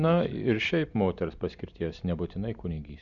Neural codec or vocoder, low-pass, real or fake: none; 7.2 kHz; real